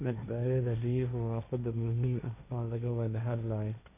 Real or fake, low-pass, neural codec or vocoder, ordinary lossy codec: fake; 3.6 kHz; codec, 16 kHz, 1.1 kbps, Voila-Tokenizer; none